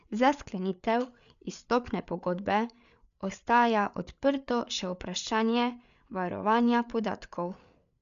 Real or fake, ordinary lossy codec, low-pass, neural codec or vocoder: fake; MP3, 96 kbps; 7.2 kHz; codec, 16 kHz, 8 kbps, FreqCodec, larger model